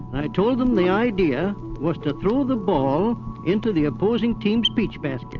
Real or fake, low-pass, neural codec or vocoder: real; 7.2 kHz; none